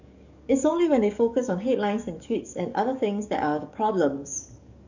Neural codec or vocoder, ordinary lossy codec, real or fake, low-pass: codec, 44.1 kHz, 7.8 kbps, DAC; none; fake; 7.2 kHz